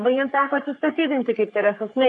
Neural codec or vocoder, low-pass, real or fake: codec, 44.1 kHz, 3.4 kbps, Pupu-Codec; 10.8 kHz; fake